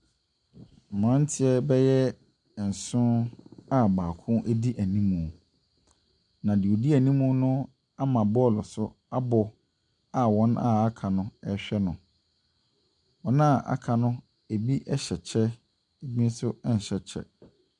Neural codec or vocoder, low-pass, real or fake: none; 10.8 kHz; real